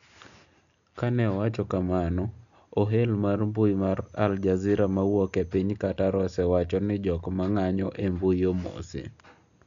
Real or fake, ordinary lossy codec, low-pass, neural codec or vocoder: real; none; 7.2 kHz; none